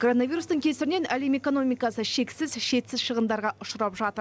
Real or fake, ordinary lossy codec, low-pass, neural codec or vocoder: real; none; none; none